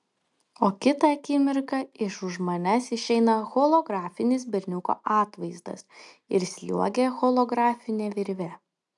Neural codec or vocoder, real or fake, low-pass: none; real; 10.8 kHz